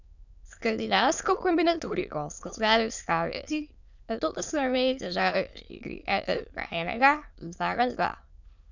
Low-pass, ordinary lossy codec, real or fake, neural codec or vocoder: 7.2 kHz; none; fake; autoencoder, 22.05 kHz, a latent of 192 numbers a frame, VITS, trained on many speakers